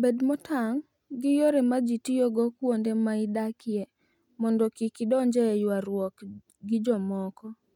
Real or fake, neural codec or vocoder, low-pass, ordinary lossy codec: real; none; 19.8 kHz; none